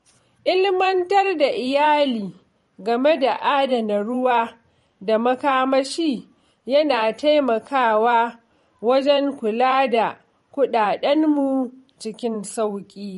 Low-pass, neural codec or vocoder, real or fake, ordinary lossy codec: 19.8 kHz; vocoder, 44.1 kHz, 128 mel bands every 512 samples, BigVGAN v2; fake; MP3, 48 kbps